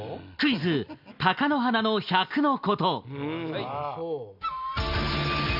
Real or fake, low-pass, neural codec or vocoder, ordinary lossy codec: real; 5.4 kHz; none; none